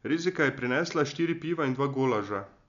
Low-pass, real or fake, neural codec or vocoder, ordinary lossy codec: 7.2 kHz; real; none; none